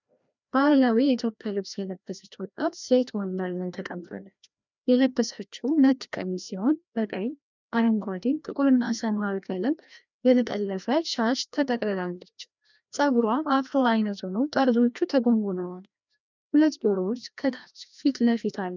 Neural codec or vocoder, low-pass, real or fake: codec, 16 kHz, 1 kbps, FreqCodec, larger model; 7.2 kHz; fake